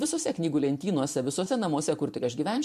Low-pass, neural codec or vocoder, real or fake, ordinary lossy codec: 14.4 kHz; vocoder, 44.1 kHz, 128 mel bands every 512 samples, BigVGAN v2; fake; MP3, 64 kbps